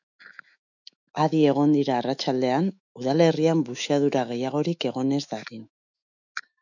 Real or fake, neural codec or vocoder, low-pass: fake; autoencoder, 48 kHz, 128 numbers a frame, DAC-VAE, trained on Japanese speech; 7.2 kHz